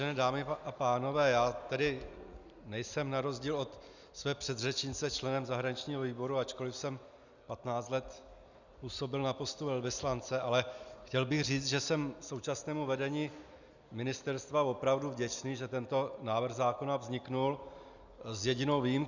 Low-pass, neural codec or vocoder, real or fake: 7.2 kHz; none; real